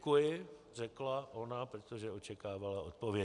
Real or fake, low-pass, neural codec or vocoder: real; 10.8 kHz; none